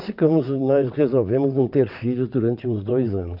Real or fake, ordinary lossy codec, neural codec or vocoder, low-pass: fake; AAC, 48 kbps; vocoder, 22.05 kHz, 80 mel bands, WaveNeXt; 5.4 kHz